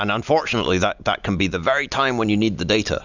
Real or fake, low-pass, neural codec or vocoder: real; 7.2 kHz; none